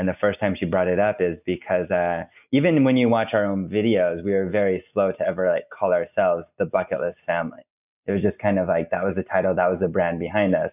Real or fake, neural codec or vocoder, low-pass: real; none; 3.6 kHz